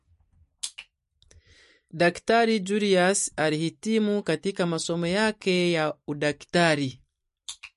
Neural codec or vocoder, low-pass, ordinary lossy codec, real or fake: vocoder, 44.1 kHz, 128 mel bands every 256 samples, BigVGAN v2; 14.4 kHz; MP3, 48 kbps; fake